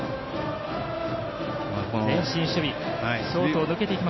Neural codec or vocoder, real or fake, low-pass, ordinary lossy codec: none; real; 7.2 kHz; MP3, 24 kbps